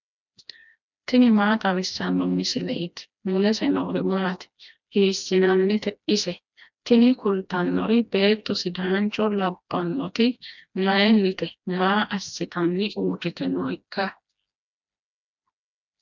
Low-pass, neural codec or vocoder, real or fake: 7.2 kHz; codec, 16 kHz, 1 kbps, FreqCodec, smaller model; fake